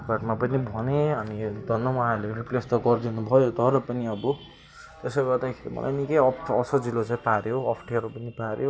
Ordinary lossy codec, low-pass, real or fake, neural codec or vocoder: none; none; real; none